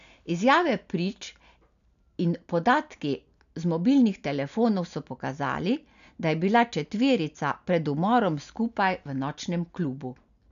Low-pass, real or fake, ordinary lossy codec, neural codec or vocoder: 7.2 kHz; real; none; none